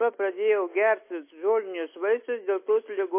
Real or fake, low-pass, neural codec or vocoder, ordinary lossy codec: real; 3.6 kHz; none; MP3, 24 kbps